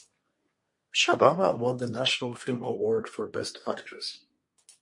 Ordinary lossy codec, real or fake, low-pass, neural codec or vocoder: MP3, 48 kbps; fake; 10.8 kHz; codec, 24 kHz, 1 kbps, SNAC